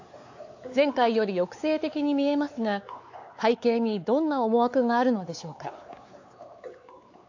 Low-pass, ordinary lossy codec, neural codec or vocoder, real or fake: 7.2 kHz; AAC, 48 kbps; codec, 16 kHz, 4 kbps, X-Codec, WavLM features, trained on Multilingual LibriSpeech; fake